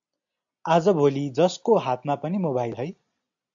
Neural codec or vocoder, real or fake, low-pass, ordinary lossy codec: none; real; 7.2 kHz; AAC, 64 kbps